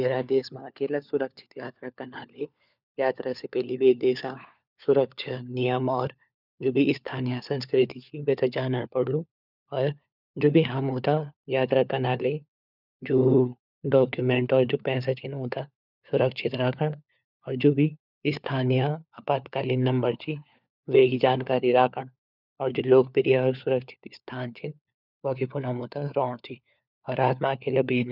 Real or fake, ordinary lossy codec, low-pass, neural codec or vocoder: fake; none; 5.4 kHz; codec, 16 kHz, 4 kbps, FunCodec, trained on LibriTTS, 50 frames a second